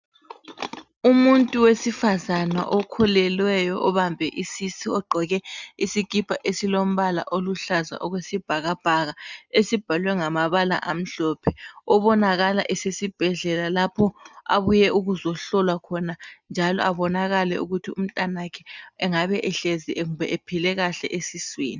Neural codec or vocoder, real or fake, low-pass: none; real; 7.2 kHz